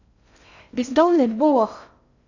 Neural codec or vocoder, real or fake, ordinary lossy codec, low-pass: codec, 16 kHz in and 24 kHz out, 0.6 kbps, FocalCodec, streaming, 2048 codes; fake; none; 7.2 kHz